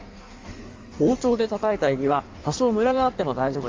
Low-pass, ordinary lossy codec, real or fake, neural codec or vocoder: 7.2 kHz; Opus, 32 kbps; fake; codec, 16 kHz in and 24 kHz out, 1.1 kbps, FireRedTTS-2 codec